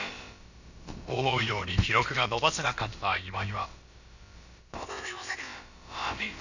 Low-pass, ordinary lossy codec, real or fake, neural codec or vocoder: 7.2 kHz; Opus, 64 kbps; fake; codec, 16 kHz, about 1 kbps, DyCAST, with the encoder's durations